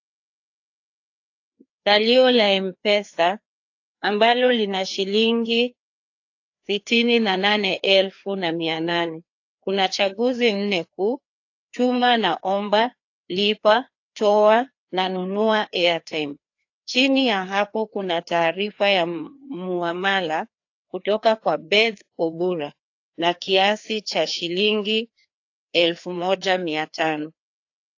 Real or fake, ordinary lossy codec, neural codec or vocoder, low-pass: fake; AAC, 48 kbps; codec, 16 kHz, 2 kbps, FreqCodec, larger model; 7.2 kHz